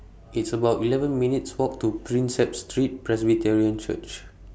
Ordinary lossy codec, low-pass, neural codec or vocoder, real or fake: none; none; none; real